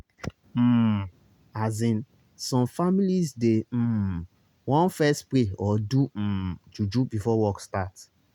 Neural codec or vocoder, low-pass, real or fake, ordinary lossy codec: none; 19.8 kHz; real; none